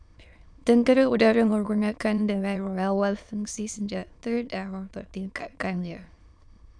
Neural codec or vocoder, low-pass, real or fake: autoencoder, 22.05 kHz, a latent of 192 numbers a frame, VITS, trained on many speakers; 9.9 kHz; fake